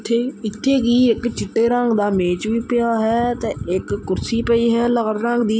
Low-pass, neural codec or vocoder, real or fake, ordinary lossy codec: none; none; real; none